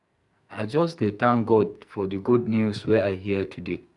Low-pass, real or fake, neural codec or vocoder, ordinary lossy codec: 10.8 kHz; fake; codec, 44.1 kHz, 2.6 kbps, SNAC; none